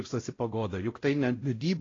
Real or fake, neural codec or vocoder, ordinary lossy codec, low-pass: fake; codec, 16 kHz, 0.5 kbps, X-Codec, WavLM features, trained on Multilingual LibriSpeech; AAC, 32 kbps; 7.2 kHz